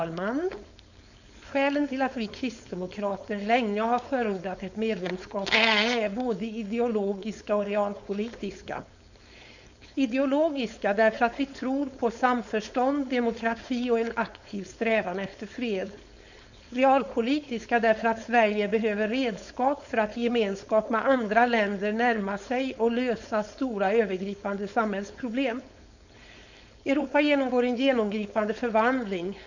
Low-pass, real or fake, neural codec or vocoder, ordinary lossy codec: 7.2 kHz; fake; codec, 16 kHz, 4.8 kbps, FACodec; none